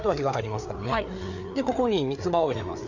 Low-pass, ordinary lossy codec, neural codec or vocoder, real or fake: 7.2 kHz; none; codec, 16 kHz, 4 kbps, FreqCodec, larger model; fake